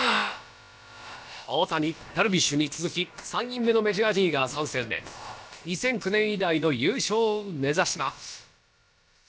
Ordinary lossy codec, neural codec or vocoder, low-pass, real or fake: none; codec, 16 kHz, about 1 kbps, DyCAST, with the encoder's durations; none; fake